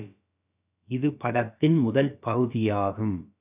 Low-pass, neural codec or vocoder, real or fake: 3.6 kHz; codec, 16 kHz, about 1 kbps, DyCAST, with the encoder's durations; fake